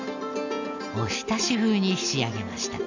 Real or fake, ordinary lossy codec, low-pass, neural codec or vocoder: real; none; 7.2 kHz; none